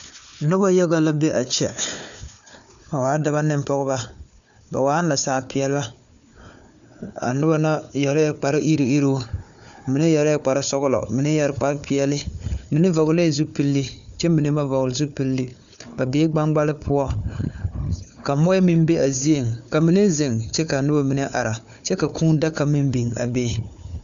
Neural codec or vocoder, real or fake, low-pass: codec, 16 kHz, 4 kbps, FunCodec, trained on Chinese and English, 50 frames a second; fake; 7.2 kHz